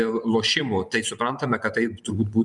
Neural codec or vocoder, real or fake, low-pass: vocoder, 24 kHz, 100 mel bands, Vocos; fake; 10.8 kHz